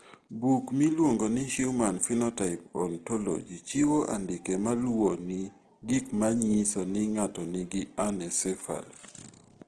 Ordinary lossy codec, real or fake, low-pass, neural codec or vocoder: Opus, 16 kbps; real; 9.9 kHz; none